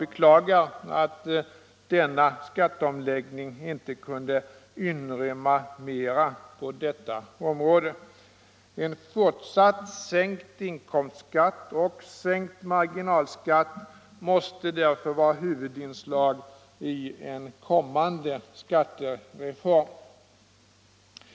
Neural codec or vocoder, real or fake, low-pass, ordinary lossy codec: none; real; none; none